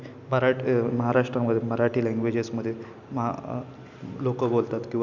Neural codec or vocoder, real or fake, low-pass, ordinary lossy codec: none; real; 7.2 kHz; none